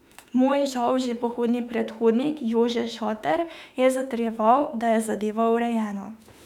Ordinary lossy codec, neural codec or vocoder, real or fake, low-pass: none; autoencoder, 48 kHz, 32 numbers a frame, DAC-VAE, trained on Japanese speech; fake; 19.8 kHz